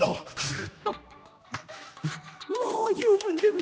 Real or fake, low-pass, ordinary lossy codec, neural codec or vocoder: fake; none; none; codec, 16 kHz, 1 kbps, X-Codec, HuBERT features, trained on general audio